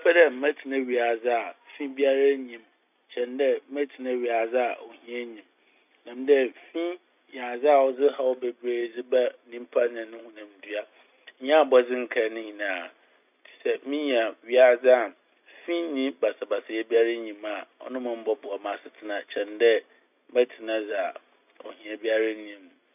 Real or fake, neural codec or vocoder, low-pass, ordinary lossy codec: real; none; 3.6 kHz; none